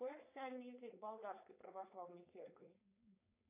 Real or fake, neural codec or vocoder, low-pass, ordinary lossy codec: fake; codec, 16 kHz, 4.8 kbps, FACodec; 3.6 kHz; AAC, 32 kbps